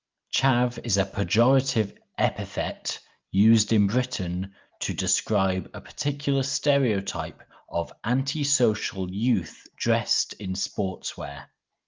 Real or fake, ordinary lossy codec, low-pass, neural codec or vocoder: real; Opus, 24 kbps; 7.2 kHz; none